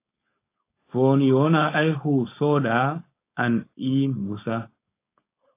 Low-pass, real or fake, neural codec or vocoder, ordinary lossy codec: 3.6 kHz; fake; codec, 16 kHz, 4.8 kbps, FACodec; AAC, 24 kbps